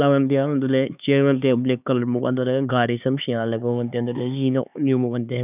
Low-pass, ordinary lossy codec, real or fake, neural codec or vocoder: 3.6 kHz; none; fake; codec, 16 kHz, 4 kbps, X-Codec, HuBERT features, trained on LibriSpeech